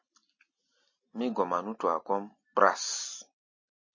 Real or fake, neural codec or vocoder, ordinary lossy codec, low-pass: real; none; MP3, 32 kbps; 7.2 kHz